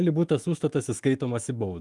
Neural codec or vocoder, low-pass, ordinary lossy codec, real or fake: autoencoder, 48 kHz, 128 numbers a frame, DAC-VAE, trained on Japanese speech; 10.8 kHz; Opus, 32 kbps; fake